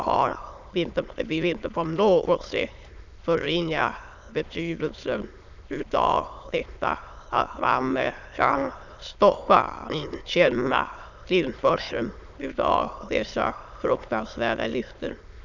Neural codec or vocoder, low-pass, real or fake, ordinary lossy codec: autoencoder, 22.05 kHz, a latent of 192 numbers a frame, VITS, trained on many speakers; 7.2 kHz; fake; none